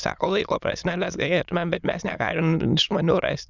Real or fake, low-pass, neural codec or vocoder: fake; 7.2 kHz; autoencoder, 22.05 kHz, a latent of 192 numbers a frame, VITS, trained on many speakers